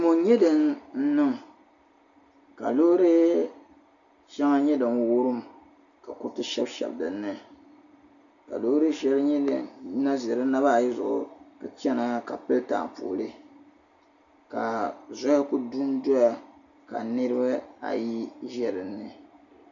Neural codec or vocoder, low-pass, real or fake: none; 7.2 kHz; real